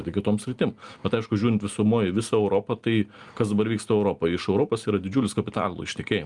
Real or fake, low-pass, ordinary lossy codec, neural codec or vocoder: real; 10.8 kHz; Opus, 32 kbps; none